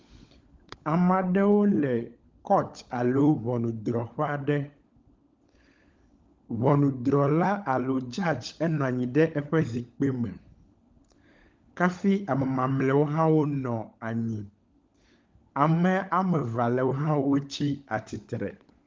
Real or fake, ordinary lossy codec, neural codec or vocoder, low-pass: fake; Opus, 32 kbps; codec, 16 kHz, 16 kbps, FunCodec, trained on LibriTTS, 50 frames a second; 7.2 kHz